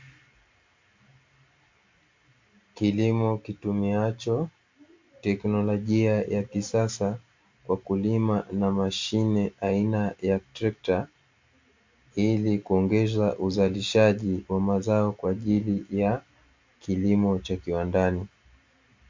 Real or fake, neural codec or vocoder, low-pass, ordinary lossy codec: real; none; 7.2 kHz; MP3, 48 kbps